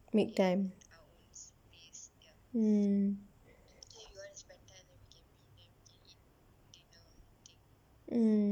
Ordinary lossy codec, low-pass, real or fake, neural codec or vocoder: none; 19.8 kHz; real; none